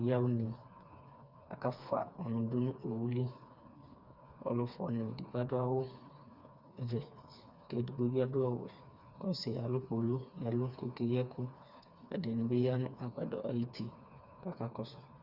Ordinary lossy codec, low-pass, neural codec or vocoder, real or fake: Opus, 64 kbps; 5.4 kHz; codec, 16 kHz, 4 kbps, FreqCodec, smaller model; fake